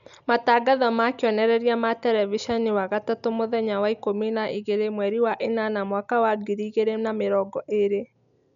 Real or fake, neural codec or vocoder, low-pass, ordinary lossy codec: real; none; 7.2 kHz; none